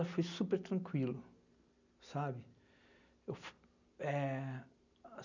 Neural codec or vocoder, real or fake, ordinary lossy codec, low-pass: none; real; none; 7.2 kHz